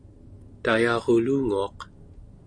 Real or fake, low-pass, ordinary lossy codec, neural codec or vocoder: real; 9.9 kHz; AAC, 64 kbps; none